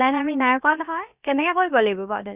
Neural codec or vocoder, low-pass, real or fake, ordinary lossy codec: codec, 16 kHz, about 1 kbps, DyCAST, with the encoder's durations; 3.6 kHz; fake; Opus, 64 kbps